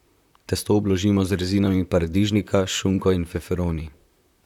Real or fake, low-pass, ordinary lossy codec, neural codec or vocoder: fake; 19.8 kHz; none; vocoder, 44.1 kHz, 128 mel bands, Pupu-Vocoder